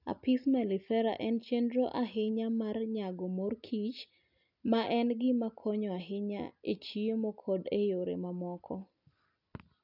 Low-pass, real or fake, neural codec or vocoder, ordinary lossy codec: 5.4 kHz; real; none; none